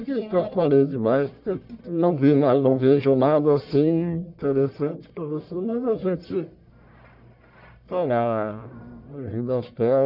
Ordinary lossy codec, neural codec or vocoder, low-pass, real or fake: none; codec, 44.1 kHz, 1.7 kbps, Pupu-Codec; 5.4 kHz; fake